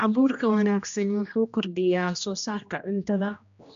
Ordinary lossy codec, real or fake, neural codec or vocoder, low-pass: MP3, 64 kbps; fake; codec, 16 kHz, 1 kbps, X-Codec, HuBERT features, trained on general audio; 7.2 kHz